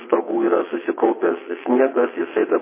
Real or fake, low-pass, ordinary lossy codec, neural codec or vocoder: fake; 3.6 kHz; MP3, 16 kbps; vocoder, 22.05 kHz, 80 mel bands, WaveNeXt